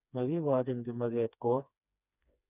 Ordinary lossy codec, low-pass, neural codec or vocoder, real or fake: none; 3.6 kHz; codec, 16 kHz, 2 kbps, FreqCodec, smaller model; fake